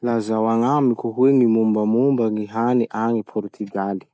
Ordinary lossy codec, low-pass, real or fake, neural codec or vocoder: none; none; real; none